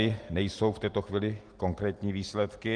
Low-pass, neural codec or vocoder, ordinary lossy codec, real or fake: 9.9 kHz; none; Opus, 24 kbps; real